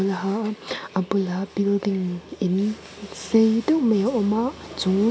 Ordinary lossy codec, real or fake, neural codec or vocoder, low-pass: none; real; none; none